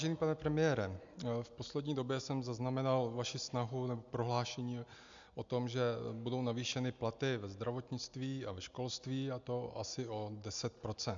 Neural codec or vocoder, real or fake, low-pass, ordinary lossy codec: none; real; 7.2 kHz; MP3, 64 kbps